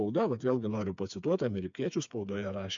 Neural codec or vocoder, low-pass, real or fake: codec, 16 kHz, 4 kbps, FreqCodec, smaller model; 7.2 kHz; fake